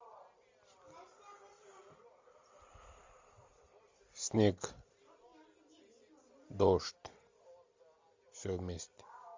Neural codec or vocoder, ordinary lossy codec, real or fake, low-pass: none; MP3, 64 kbps; real; 7.2 kHz